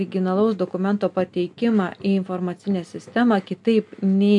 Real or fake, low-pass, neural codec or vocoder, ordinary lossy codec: real; 10.8 kHz; none; MP3, 64 kbps